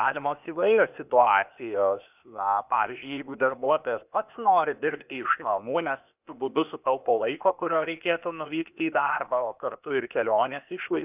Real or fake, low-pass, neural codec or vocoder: fake; 3.6 kHz; codec, 16 kHz, 0.8 kbps, ZipCodec